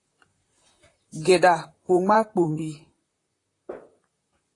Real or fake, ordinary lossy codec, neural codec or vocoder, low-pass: fake; AAC, 32 kbps; vocoder, 44.1 kHz, 128 mel bands, Pupu-Vocoder; 10.8 kHz